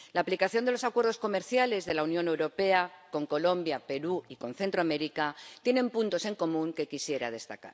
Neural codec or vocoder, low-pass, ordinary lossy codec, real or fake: none; none; none; real